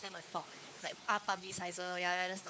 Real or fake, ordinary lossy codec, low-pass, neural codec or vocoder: fake; none; none; codec, 16 kHz, 4 kbps, X-Codec, WavLM features, trained on Multilingual LibriSpeech